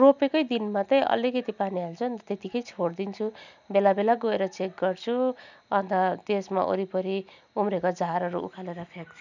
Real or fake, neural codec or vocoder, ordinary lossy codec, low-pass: real; none; none; 7.2 kHz